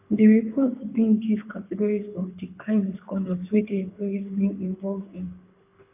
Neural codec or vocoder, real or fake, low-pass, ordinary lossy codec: codec, 24 kHz, 6 kbps, HILCodec; fake; 3.6 kHz; AAC, 32 kbps